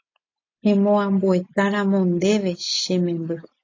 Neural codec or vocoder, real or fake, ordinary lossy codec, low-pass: none; real; AAC, 32 kbps; 7.2 kHz